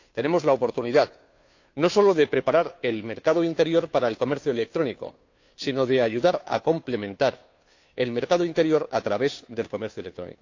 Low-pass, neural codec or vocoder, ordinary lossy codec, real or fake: 7.2 kHz; codec, 16 kHz, 2 kbps, FunCodec, trained on Chinese and English, 25 frames a second; AAC, 48 kbps; fake